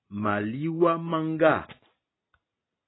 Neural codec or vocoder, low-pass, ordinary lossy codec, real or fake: none; 7.2 kHz; AAC, 16 kbps; real